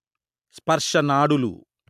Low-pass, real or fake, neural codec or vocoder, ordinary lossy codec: 14.4 kHz; real; none; MP3, 96 kbps